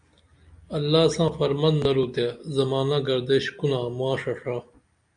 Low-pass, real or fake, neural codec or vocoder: 9.9 kHz; real; none